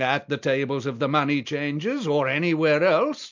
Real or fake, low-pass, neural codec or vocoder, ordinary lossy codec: real; 7.2 kHz; none; MP3, 64 kbps